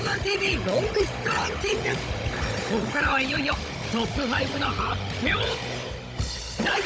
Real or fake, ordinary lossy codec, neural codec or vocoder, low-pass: fake; none; codec, 16 kHz, 16 kbps, FunCodec, trained on Chinese and English, 50 frames a second; none